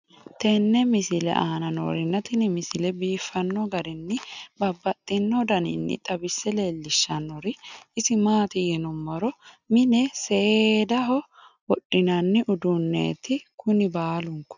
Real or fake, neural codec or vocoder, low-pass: real; none; 7.2 kHz